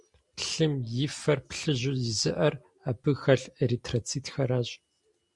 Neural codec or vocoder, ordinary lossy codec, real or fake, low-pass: none; Opus, 64 kbps; real; 10.8 kHz